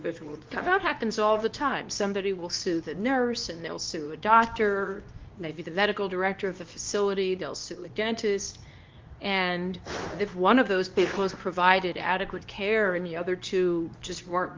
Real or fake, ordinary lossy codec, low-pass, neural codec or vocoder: fake; Opus, 24 kbps; 7.2 kHz; codec, 24 kHz, 0.9 kbps, WavTokenizer, medium speech release version 2